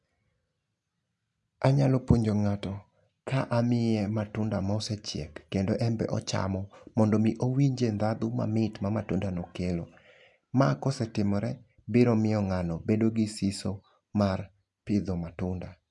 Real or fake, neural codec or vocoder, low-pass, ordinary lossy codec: real; none; 10.8 kHz; none